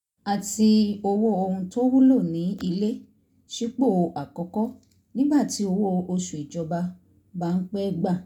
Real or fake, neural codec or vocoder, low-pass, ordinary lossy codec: real; none; 19.8 kHz; none